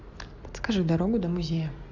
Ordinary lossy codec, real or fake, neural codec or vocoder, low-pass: AAC, 48 kbps; real; none; 7.2 kHz